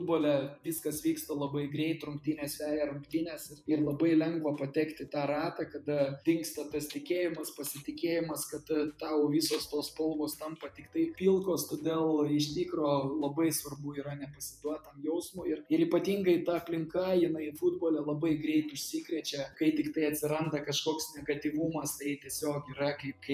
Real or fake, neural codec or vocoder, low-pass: fake; vocoder, 48 kHz, 128 mel bands, Vocos; 14.4 kHz